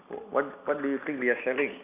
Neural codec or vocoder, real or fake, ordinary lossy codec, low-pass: none; real; none; 3.6 kHz